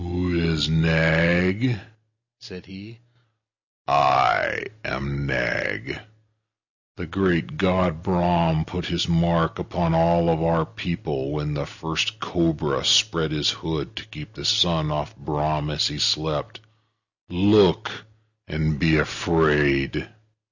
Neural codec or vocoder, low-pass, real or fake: none; 7.2 kHz; real